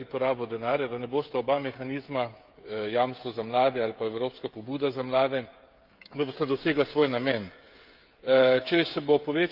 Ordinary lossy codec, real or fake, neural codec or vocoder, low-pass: Opus, 32 kbps; fake; codec, 16 kHz, 16 kbps, FreqCodec, smaller model; 5.4 kHz